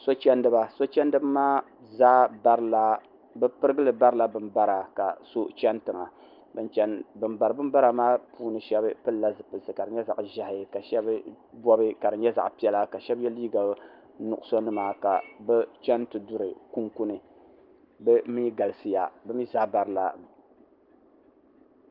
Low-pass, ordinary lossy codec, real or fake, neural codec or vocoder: 5.4 kHz; Opus, 32 kbps; real; none